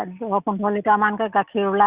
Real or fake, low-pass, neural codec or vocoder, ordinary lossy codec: real; 3.6 kHz; none; Opus, 64 kbps